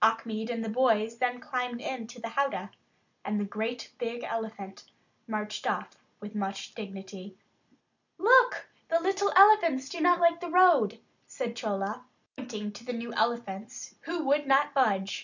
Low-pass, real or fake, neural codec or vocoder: 7.2 kHz; real; none